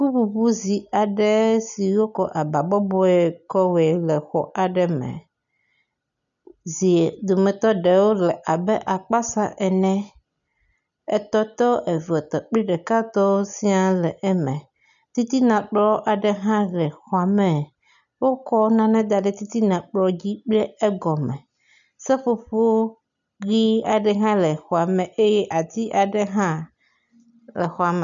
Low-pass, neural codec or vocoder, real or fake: 7.2 kHz; none; real